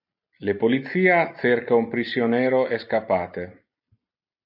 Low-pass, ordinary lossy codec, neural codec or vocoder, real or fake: 5.4 kHz; AAC, 48 kbps; none; real